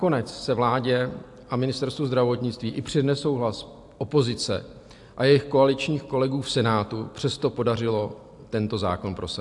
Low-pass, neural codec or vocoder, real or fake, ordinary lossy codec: 10.8 kHz; none; real; AAC, 64 kbps